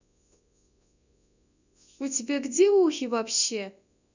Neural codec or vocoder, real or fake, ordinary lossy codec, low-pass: codec, 24 kHz, 0.9 kbps, WavTokenizer, large speech release; fake; none; 7.2 kHz